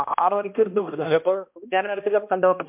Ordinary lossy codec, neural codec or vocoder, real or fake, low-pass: MP3, 32 kbps; codec, 16 kHz, 1 kbps, X-Codec, HuBERT features, trained on balanced general audio; fake; 3.6 kHz